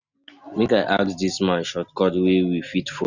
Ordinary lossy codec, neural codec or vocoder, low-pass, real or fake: none; none; 7.2 kHz; real